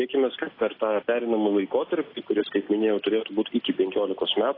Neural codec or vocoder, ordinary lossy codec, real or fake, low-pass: none; AAC, 24 kbps; real; 5.4 kHz